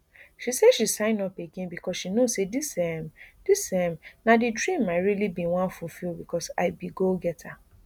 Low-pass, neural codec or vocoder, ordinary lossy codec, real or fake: none; none; none; real